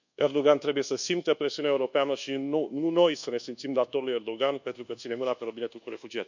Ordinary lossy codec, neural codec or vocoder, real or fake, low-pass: none; codec, 24 kHz, 1.2 kbps, DualCodec; fake; 7.2 kHz